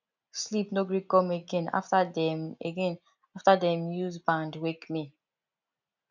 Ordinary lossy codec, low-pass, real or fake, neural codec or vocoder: none; 7.2 kHz; real; none